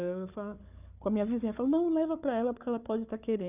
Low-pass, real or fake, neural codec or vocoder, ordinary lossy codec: 3.6 kHz; fake; codec, 16 kHz, 4 kbps, FunCodec, trained on Chinese and English, 50 frames a second; none